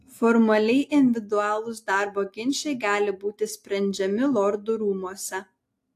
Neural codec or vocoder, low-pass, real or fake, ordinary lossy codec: none; 14.4 kHz; real; AAC, 64 kbps